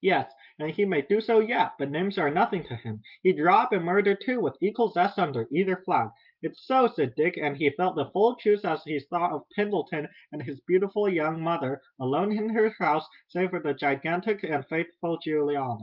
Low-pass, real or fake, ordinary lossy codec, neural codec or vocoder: 5.4 kHz; real; Opus, 24 kbps; none